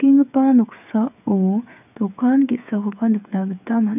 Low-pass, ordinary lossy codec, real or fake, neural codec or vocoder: 3.6 kHz; none; fake; codec, 16 kHz, 8 kbps, FreqCodec, smaller model